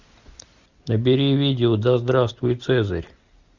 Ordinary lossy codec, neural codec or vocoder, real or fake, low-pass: Opus, 64 kbps; none; real; 7.2 kHz